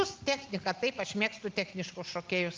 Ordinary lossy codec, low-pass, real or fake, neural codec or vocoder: Opus, 24 kbps; 7.2 kHz; real; none